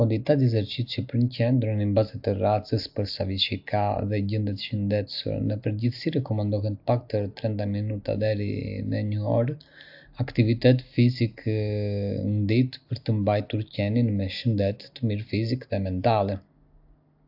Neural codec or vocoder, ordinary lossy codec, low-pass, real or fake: none; AAC, 48 kbps; 5.4 kHz; real